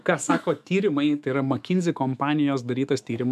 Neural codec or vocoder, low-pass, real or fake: autoencoder, 48 kHz, 128 numbers a frame, DAC-VAE, trained on Japanese speech; 14.4 kHz; fake